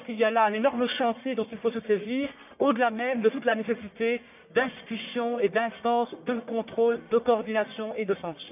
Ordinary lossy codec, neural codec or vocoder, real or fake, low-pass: none; codec, 44.1 kHz, 1.7 kbps, Pupu-Codec; fake; 3.6 kHz